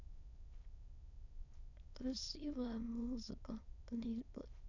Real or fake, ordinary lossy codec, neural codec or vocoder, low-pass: fake; none; autoencoder, 22.05 kHz, a latent of 192 numbers a frame, VITS, trained on many speakers; 7.2 kHz